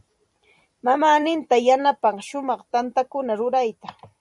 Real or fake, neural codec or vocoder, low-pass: fake; vocoder, 44.1 kHz, 128 mel bands every 256 samples, BigVGAN v2; 10.8 kHz